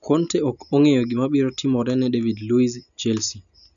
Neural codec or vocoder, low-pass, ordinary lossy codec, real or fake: none; 7.2 kHz; none; real